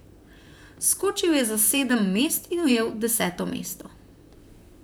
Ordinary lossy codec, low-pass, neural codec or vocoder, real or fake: none; none; vocoder, 44.1 kHz, 128 mel bands, Pupu-Vocoder; fake